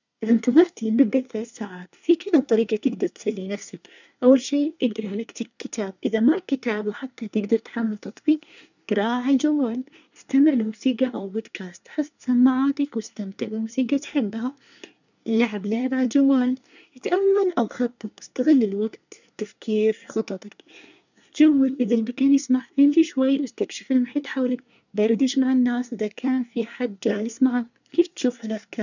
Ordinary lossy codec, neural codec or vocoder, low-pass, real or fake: none; codec, 24 kHz, 1 kbps, SNAC; 7.2 kHz; fake